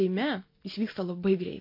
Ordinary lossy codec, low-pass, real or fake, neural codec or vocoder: MP3, 32 kbps; 5.4 kHz; real; none